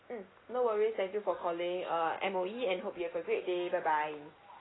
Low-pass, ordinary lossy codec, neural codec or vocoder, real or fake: 7.2 kHz; AAC, 16 kbps; none; real